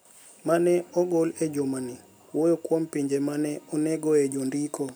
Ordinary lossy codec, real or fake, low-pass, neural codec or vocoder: none; real; none; none